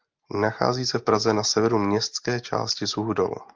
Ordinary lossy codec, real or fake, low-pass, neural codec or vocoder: Opus, 24 kbps; real; 7.2 kHz; none